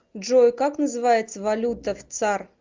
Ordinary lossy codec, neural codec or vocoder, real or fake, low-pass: Opus, 24 kbps; none; real; 7.2 kHz